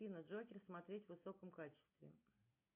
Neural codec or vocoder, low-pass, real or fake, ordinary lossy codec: none; 3.6 kHz; real; MP3, 32 kbps